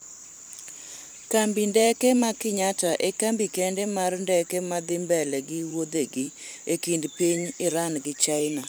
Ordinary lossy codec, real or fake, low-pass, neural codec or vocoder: none; fake; none; vocoder, 44.1 kHz, 128 mel bands every 512 samples, BigVGAN v2